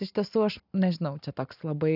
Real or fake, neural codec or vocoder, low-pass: real; none; 5.4 kHz